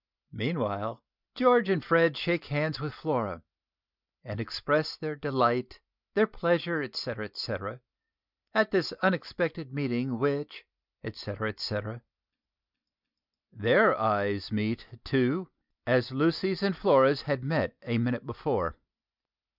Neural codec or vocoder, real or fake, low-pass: none; real; 5.4 kHz